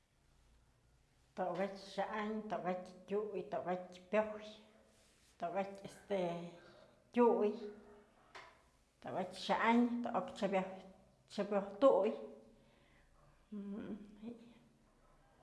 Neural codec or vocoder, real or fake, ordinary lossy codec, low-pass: none; real; none; none